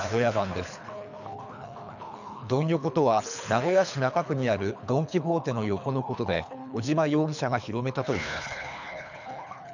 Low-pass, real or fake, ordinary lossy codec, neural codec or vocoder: 7.2 kHz; fake; none; codec, 24 kHz, 3 kbps, HILCodec